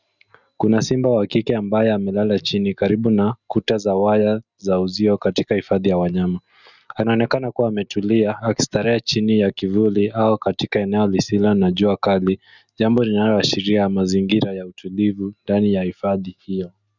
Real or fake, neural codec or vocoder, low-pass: real; none; 7.2 kHz